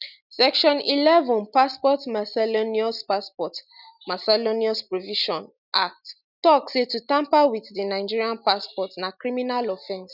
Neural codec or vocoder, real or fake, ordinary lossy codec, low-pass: none; real; none; 5.4 kHz